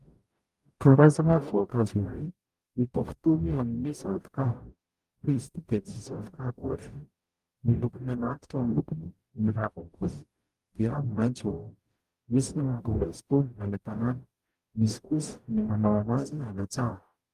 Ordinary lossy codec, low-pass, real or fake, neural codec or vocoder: Opus, 32 kbps; 14.4 kHz; fake; codec, 44.1 kHz, 0.9 kbps, DAC